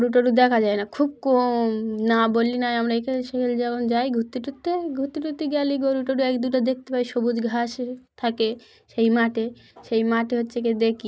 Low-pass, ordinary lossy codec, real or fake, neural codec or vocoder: none; none; real; none